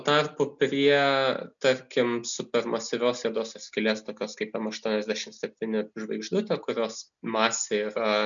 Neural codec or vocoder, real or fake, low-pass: none; real; 7.2 kHz